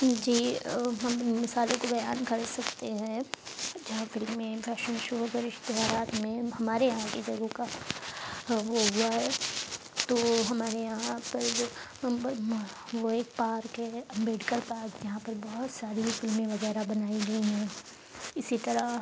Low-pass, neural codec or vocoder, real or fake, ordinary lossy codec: none; none; real; none